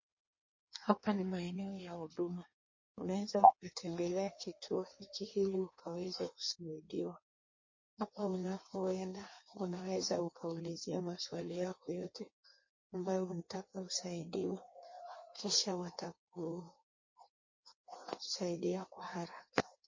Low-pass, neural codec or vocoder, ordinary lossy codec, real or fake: 7.2 kHz; codec, 16 kHz in and 24 kHz out, 1.1 kbps, FireRedTTS-2 codec; MP3, 32 kbps; fake